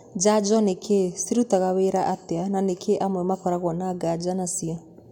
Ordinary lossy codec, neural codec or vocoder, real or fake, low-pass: MP3, 96 kbps; none; real; 19.8 kHz